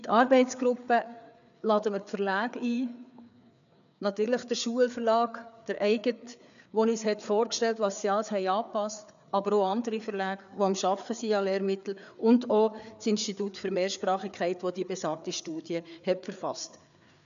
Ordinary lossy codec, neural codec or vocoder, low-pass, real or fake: none; codec, 16 kHz, 4 kbps, FreqCodec, larger model; 7.2 kHz; fake